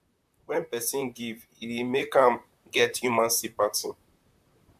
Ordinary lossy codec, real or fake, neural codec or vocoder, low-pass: MP3, 96 kbps; fake; vocoder, 44.1 kHz, 128 mel bands, Pupu-Vocoder; 14.4 kHz